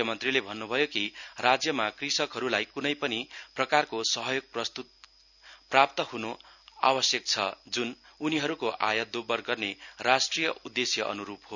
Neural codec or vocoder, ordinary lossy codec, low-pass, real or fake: none; none; 7.2 kHz; real